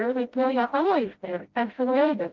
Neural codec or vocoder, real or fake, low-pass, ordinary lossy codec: codec, 16 kHz, 0.5 kbps, FreqCodec, smaller model; fake; 7.2 kHz; Opus, 32 kbps